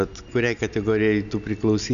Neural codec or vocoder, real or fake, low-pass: none; real; 7.2 kHz